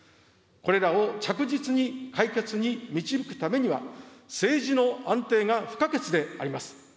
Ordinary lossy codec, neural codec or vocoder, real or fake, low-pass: none; none; real; none